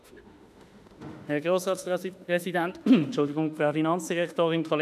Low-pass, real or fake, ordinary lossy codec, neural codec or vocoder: 14.4 kHz; fake; none; autoencoder, 48 kHz, 32 numbers a frame, DAC-VAE, trained on Japanese speech